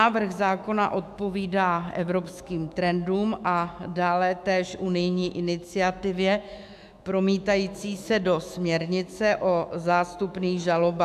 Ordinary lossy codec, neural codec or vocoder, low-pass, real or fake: AAC, 96 kbps; autoencoder, 48 kHz, 128 numbers a frame, DAC-VAE, trained on Japanese speech; 14.4 kHz; fake